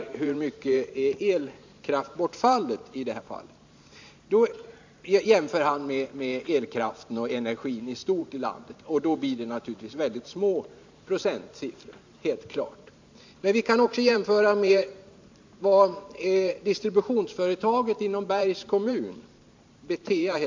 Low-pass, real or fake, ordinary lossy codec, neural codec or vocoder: 7.2 kHz; fake; MP3, 64 kbps; vocoder, 44.1 kHz, 128 mel bands every 512 samples, BigVGAN v2